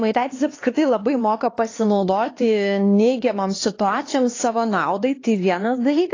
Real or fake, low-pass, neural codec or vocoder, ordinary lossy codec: fake; 7.2 kHz; codec, 16 kHz, 2 kbps, X-Codec, HuBERT features, trained on LibriSpeech; AAC, 32 kbps